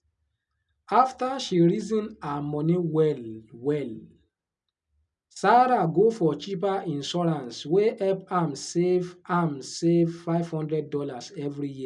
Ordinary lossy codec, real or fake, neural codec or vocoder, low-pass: none; real; none; 10.8 kHz